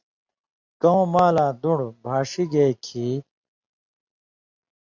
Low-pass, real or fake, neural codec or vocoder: 7.2 kHz; real; none